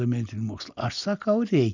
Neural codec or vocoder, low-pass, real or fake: none; 7.2 kHz; real